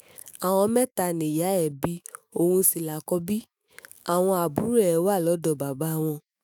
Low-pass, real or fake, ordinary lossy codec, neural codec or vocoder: none; fake; none; autoencoder, 48 kHz, 128 numbers a frame, DAC-VAE, trained on Japanese speech